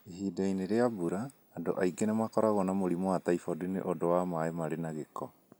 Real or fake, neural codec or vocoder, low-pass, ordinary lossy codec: real; none; none; none